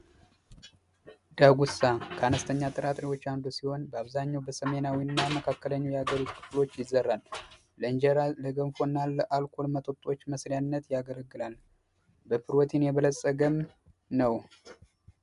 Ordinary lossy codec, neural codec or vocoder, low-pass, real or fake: MP3, 96 kbps; none; 10.8 kHz; real